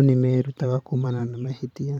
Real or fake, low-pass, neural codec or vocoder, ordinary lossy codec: fake; 19.8 kHz; vocoder, 44.1 kHz, 128 mel bands every 512 samples, BigVGAN v2; none